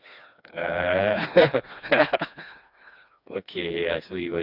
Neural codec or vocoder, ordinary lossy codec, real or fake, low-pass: codec, 16 kHz, 2 kbps, FreqCodec, smaller model; none; fake; 5.4 kHz